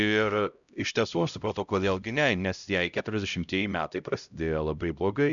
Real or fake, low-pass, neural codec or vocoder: fake; 7.2 kHz; codec, 16 kHz, 0.5 kbps, X-Codec, HuBERT features, trained on LibriSpeech